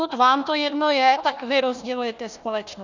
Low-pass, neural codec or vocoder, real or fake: 7.2 kHz; codec, 16 kHz, 1 kbps, FunCodec, trained on Chinese and English, 50 frames a second; fake